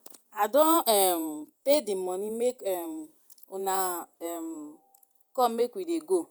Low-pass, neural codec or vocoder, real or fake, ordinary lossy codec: none; vocoder, 48 kHz, 128 mel bands, Vocos; fake; none